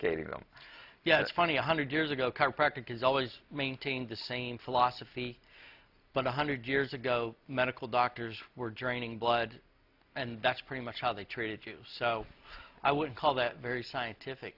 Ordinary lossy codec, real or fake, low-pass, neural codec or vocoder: AAC, 48 kbps; real; 5.4 kHz; none